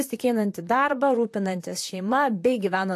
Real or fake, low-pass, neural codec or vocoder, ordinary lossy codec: fake; 14.4 kHz; vocoder, 44.1 kHz, 128 mel bands, Pupu-Vocoder; AAC, 64 kbps